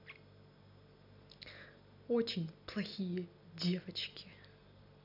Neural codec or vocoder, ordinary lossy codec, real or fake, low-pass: none; none; real; 5.4 kHz